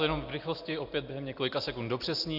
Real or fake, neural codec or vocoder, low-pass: real; none; 5.4 kHz